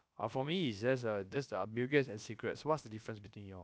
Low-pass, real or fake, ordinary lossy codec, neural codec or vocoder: none; fake; none; codec, 16 kHz, about 1 kbps, DyCAST, with the encoder's durations